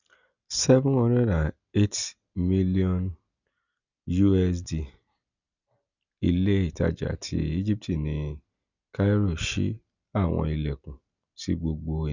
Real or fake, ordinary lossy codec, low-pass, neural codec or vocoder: real; none; 7.2 kHz; none